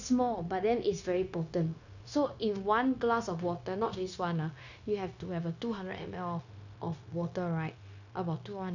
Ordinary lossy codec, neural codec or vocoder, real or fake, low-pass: none; codec, 16 kHz, 0.9 kbps, LongCat-Audio-Codec; fake; 7.2 kHz